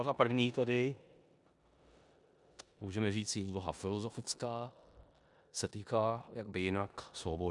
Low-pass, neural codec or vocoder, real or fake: 10.8 kHz; codec, 16 kHz in and 24 kHz out, 0.9 kbps, LongCat-Audio-Codec, four codebook decoder; fake